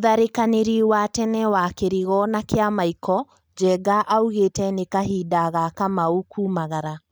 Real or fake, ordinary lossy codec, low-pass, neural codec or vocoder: real; none; none; none